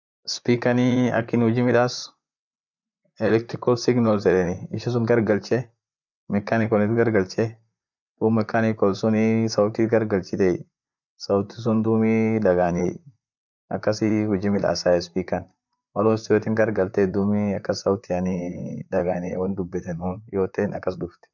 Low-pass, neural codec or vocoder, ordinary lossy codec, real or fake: 7.2 kHz; vocoder, 44.1 kHz, 128 mel bands, Pupu-Vocoder; none; fake